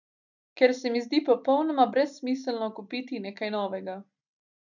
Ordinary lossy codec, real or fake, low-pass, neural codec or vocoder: none; real; 7.2 kHz; none